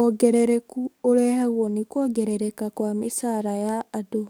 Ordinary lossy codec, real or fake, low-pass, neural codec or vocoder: none; fake; none; codec, 44.1 kHz, 7.8 kbps, DAC